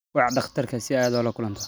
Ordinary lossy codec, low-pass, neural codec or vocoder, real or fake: none; none; none; real